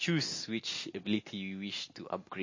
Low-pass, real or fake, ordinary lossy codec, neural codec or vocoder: 7.2 kHz; real; MP3, 32 kbps; none